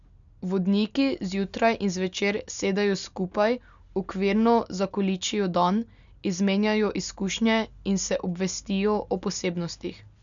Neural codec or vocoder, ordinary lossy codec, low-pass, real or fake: none; none; 7.2 kHz; real